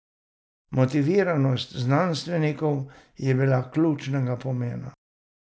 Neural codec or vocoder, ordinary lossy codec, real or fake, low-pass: none; none; real; none